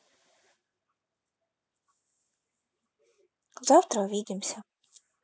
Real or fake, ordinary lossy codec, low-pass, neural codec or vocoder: real; none; none; none